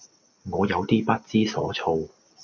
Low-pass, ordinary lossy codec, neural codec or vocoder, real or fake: 7.2 kHz; MP3, 48 kbps; none; real